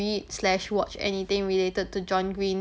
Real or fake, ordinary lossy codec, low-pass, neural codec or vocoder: real; none; none; none